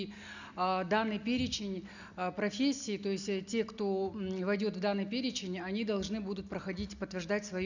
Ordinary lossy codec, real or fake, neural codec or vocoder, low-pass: none; real; none; 7.2 kHz